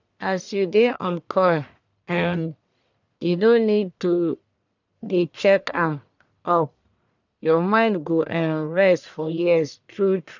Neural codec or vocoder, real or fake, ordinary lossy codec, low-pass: codec, 44.1 kHz, 1.7 kbps, Pupu-Codec; fake; none; 7.2 kHz